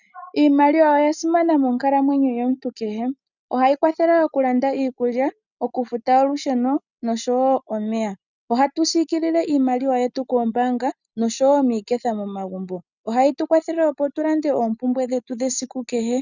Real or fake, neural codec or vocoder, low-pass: real; none; 7.2 kHz